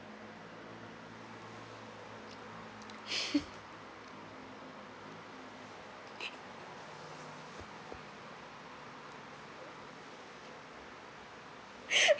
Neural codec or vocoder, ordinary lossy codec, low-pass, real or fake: none; none; none; real